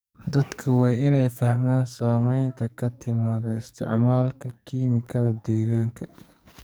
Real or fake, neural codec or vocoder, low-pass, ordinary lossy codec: fake; codec, 44.1 kHz, 2.6 kbps, SNAC; none; none